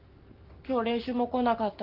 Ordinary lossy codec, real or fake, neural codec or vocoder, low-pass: Opus, 32 kbps; real; none; 5.4 kHz